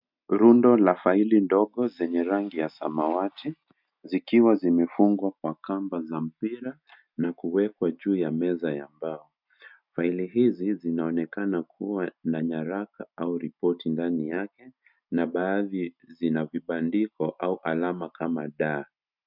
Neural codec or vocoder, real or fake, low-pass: none; real; 5.4 kHz